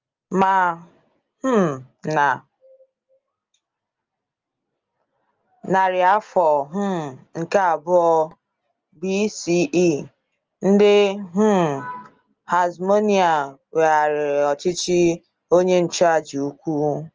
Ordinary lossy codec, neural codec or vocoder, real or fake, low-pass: Opus, 24 kbps; none; real; 7.2 kHz